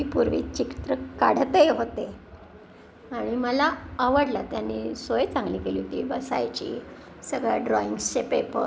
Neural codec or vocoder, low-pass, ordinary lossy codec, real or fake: none; none; none; real